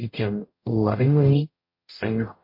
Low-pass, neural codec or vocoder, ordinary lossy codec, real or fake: 5.4 kHz; codec, 44.1 kHz, 0.9 kbps, DAC; MP3, 32 kbps; fake